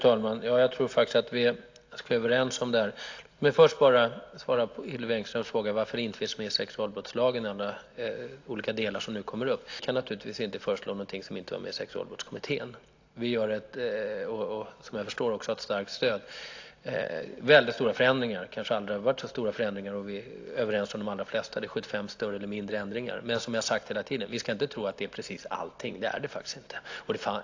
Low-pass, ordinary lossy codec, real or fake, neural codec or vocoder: 7.2 kHz; AAC, 48 kbps; real; none